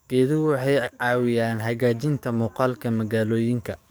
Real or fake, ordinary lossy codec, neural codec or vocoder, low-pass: fake; none; codec, 44.1 kHz, 7.8 kbps, DAC; none